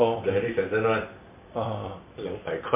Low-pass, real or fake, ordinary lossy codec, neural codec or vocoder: 3.6 kHz; fake; MP3, 24 kbps; vocoder, 44.1 kHz, 128 mel bands every 256 samples, BigVGAN v2